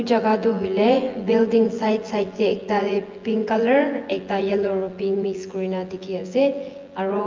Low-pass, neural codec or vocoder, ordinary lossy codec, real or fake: 7.2 kHz; vocoder, 24 kHz, 100 mel bands, Vocos; Opus, 32 kbps; fake